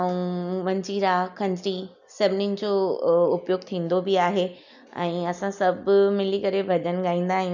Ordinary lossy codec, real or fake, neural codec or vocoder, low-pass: none; real; none; 7.2 kHz